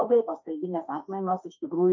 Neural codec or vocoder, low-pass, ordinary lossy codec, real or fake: autoencoder, 48 kHz, 32 numbers a frame, DAC-VAE, trained on Japanese speech; 7.2 kHz; MP3, 32 kbps; fake